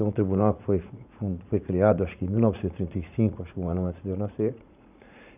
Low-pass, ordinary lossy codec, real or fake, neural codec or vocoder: 3.6 kHz; none; fake; vocoder, 44.1 kHz, 80 mel bands, Vocos